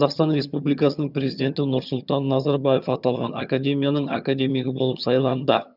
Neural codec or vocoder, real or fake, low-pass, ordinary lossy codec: vocoder, 22.05 kHz, 80 mel bands, HiFi-GAN; fake; 5.4 kHz; none